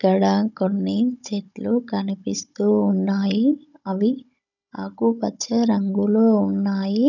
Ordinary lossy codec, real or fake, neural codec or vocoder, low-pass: none; fake; codec, 16 kHz, 16 kbps, FunCodec, trained on Chinese and English, 50 frames a second; 7.2 kHz